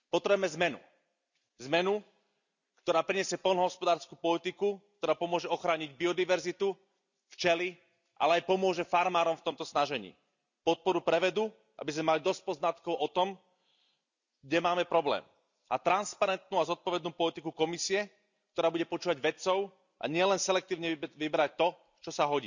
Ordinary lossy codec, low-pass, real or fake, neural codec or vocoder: none; 7.2 kHz; real; none